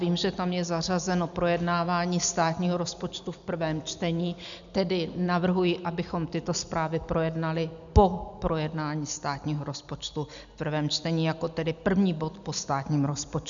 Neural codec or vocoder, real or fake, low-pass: none; real; 7.2 kHz